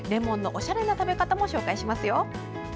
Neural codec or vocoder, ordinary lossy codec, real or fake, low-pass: none; none; real; none